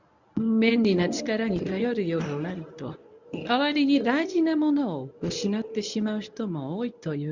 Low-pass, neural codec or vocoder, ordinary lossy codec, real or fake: 7.2 kHz; codec, 24 kHz, 0.9 kbps, WavTokenizer, medium speech release version 1; none; fake